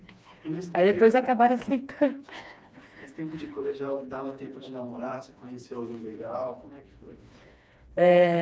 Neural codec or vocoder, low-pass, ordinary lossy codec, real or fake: codec, 16 kHz, 2 kbps, FreqCodec, smaller model; none; none; fake